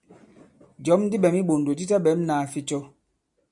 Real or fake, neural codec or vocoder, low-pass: real; none; 10.8 kHz